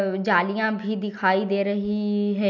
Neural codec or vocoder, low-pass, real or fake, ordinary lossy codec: none; 7.2 kHz; real; none